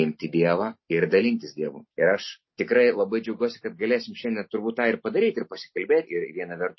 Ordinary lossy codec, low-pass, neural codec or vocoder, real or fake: MP3, 24 kbps; 7.2 kHz; none; real